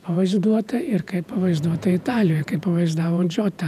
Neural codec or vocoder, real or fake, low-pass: vocoder, 48 kHz, 128 mel bands, Vocos; fake; 14.4 kHz